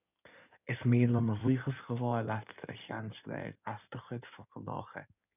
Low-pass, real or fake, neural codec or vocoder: 3.6 kHz; fake; codec, 16 kHz in and 24 kHz out, 2.2 kbps, FireRedTTS-2 codec